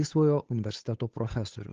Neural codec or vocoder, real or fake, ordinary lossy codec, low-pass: codec, 16 kHz, 8 kbps, FunCodec, trained on Chinese and English, 25 frames a second; fake; Opus, 16 kbps; 7.2 kHz